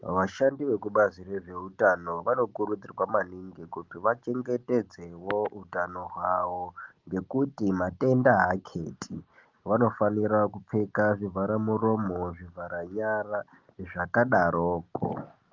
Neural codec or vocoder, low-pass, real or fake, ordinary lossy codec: none; 7.2 kHz; real; Opus, 32 kbps